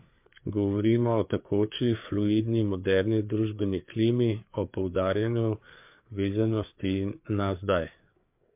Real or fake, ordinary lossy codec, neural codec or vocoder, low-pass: fake; MP3, 32 kbps; codec, 16 kHz, 4 kbps, FreqCodec, larger model; 3.6 kHz